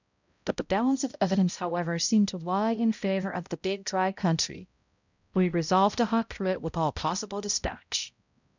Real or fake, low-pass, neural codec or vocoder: fake; 7.2 kHz; codec, 16 kHz, 0.5 kbps, X-Codec, HuBERT features, trained on balanced general audio